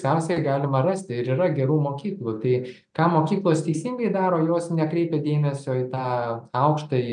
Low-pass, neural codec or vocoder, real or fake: 9.9 kHz; none; real